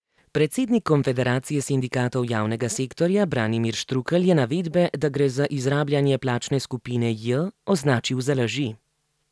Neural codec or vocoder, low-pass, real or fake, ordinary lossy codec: none; none; real; none